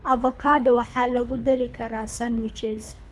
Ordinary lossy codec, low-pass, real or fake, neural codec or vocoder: none; none; fake; codec, 24 kHz, 3 kbps, HILCodec